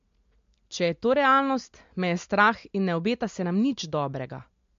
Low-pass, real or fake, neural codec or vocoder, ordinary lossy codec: 7.2 kHz; real; none; MP3, 48 kbps